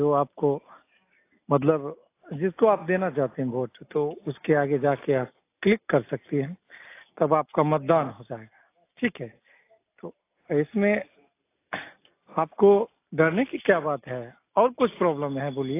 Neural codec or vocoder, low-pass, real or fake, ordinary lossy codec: none; 3.6 kHz; real; AAC, 24 kbps